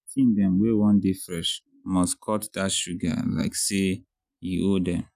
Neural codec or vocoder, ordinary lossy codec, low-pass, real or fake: none; none; 14.4 kHz; real